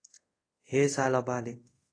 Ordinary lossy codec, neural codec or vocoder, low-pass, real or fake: AAC, 32 kbps; codec, 24 kHz, 0.5 kbps, DualCodec; 9.9 kHz; fake